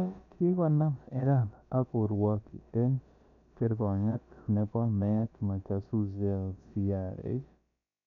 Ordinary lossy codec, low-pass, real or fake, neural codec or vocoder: none; 7.2 kHz; fake; codec, 16 kHz, about 1 kbps, DyCAST, with the encoder's durations